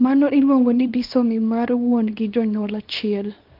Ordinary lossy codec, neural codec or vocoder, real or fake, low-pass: Opus, 32 kbps; codec, 24 kHz, 0.9 kbps, WavTokenizer, small release; fake; 5.4 kHz